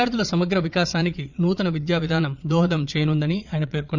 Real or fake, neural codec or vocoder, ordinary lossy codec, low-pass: fake; vocoder, 22.05 kHz, 80 mel bands, Vocos; none; 7.2 kHz